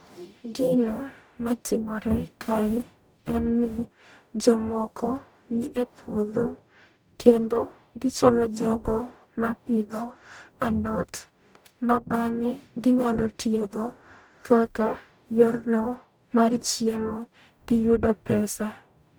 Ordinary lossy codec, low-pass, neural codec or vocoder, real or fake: none; none; codec, 44.1 kHz, 0.9 kbps, DAC; fake